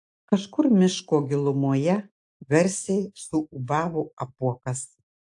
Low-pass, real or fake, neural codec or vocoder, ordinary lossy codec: 10.8 kHz; real; none; AAC, 64 kbps